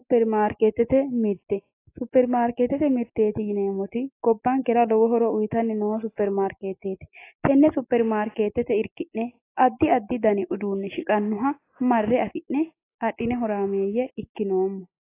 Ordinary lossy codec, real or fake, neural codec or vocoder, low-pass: AAC, 24 kbps; real; none; 3.6 kHz